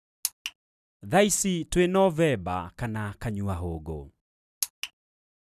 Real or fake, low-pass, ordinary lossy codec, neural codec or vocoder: real; 14.4 kHz; none; none